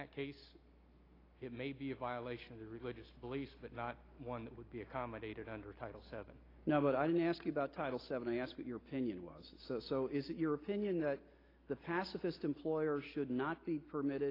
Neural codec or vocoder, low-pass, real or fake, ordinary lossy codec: none; 5.4 kHz; real; AAC, 24 kbps